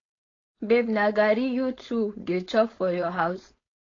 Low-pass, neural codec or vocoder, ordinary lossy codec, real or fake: 7.2 kHz; codec, 16 kHz, 4.8 kbps, FACodec; AAC, 32 kbps; fake